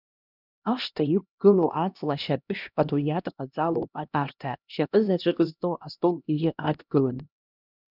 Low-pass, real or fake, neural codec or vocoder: 5.4 kHz; fake; codec, 16 kHz, 1 kbps, X-Codec, HuBERT features, trained on LibriSpeech